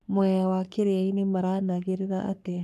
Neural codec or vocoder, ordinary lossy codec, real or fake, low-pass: codec, 44.1 kHz, 3.4 kbps, Pupu-Codec; none; fake; 14.4 kHz